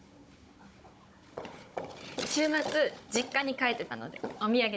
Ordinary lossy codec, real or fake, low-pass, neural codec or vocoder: none; fake; none; codec, 16 kHz, 16 kbps, FunCodec, trained on Chinese and English, 50 frames a second